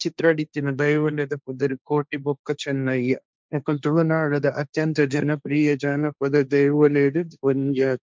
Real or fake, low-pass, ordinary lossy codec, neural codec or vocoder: fake; none; none; codec, 16 kHz, 1.1 kbps, Voila-Tokenizer